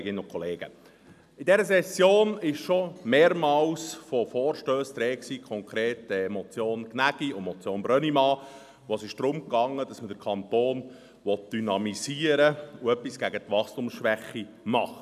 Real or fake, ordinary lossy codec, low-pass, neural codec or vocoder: real; none; 14.4 kHz; none